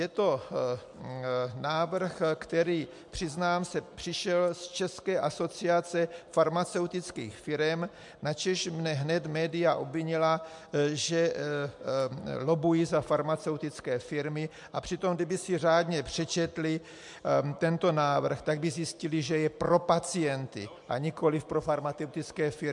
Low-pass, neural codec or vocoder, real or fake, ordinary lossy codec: 10.8 kHz; none; real; MP3, 64 kbps